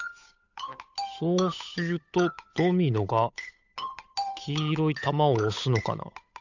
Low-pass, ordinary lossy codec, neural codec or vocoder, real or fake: 7.2 kHz; none; codec, 16 kHz, 8 kbps, FunCodec, trained on Chinese and English, 25 frames a second; fake